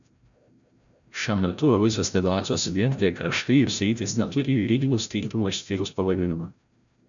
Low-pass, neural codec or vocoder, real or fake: 7.2 kHz; codec, 16 kHz, 0.5 kbps, FreqCodec, larger model; fake